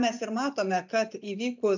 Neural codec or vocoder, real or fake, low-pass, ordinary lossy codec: none; real; 7.2 kHz; MP3, 64 kbps